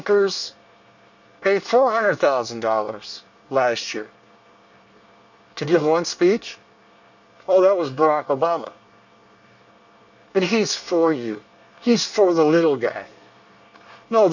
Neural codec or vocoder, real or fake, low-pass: codec, 24 kHz, 1 kbps, SNAC; fake; 7.2 kHz